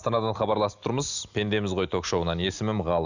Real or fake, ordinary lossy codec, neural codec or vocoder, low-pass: real; none; none; 7.2 kHz